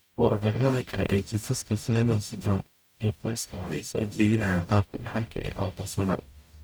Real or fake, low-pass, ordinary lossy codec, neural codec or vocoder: fake; none; none; codec, 44.1 kHz, 0.9 kbps, DAC